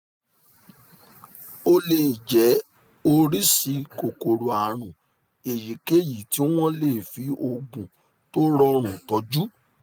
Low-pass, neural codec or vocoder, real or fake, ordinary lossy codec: none; none; real; none